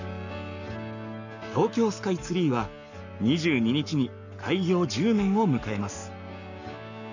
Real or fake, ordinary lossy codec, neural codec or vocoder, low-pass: fake; none; codec, 44.1 kHz, 7.8 kbps, Pupu-Codec; 7.2 kHz